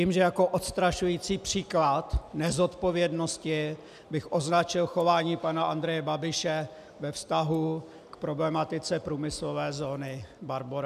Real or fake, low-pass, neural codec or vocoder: real; 14.4 kHz; none